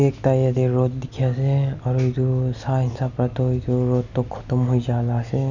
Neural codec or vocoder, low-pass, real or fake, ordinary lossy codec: none; 7.2 kHz; real; none